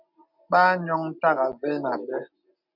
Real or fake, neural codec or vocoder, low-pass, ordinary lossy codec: real; none; 5.4 kHz; MP3, 48 kbps